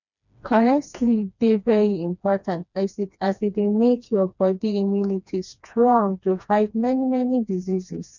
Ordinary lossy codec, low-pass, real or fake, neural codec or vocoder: none; 7.2 kHz; fake; codec, 16 kHz, 2 kbps, FreqCodec, smaller model